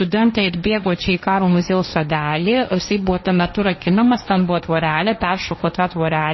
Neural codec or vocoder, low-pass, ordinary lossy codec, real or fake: codec, 16 kHz, 1.1 kbps, Voila-Tokenizer; 7.2 kHz; MP3, 24 kbps; fake